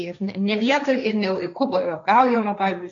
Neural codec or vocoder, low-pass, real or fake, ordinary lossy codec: codec, 16 kHz, 1.1 kbps, Voila-Tokenizer; 7.2 kHz; fake; AAC, 64 kbps